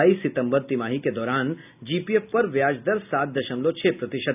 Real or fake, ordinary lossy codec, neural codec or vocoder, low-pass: real; none; none; 3.6 kHz